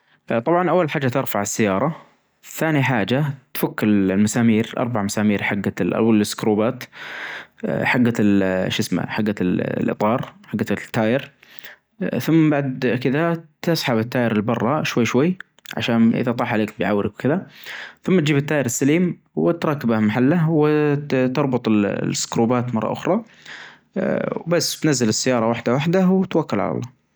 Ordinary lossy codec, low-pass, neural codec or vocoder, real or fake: none; none; none; real